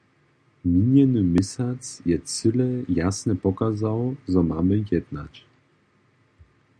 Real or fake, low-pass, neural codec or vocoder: real; 9.9 kHz; none